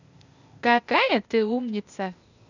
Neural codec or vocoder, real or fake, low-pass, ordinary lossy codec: codec, 16 kHz, 0.8 kbps, ZipCodec; fake; 7.2 kHz; none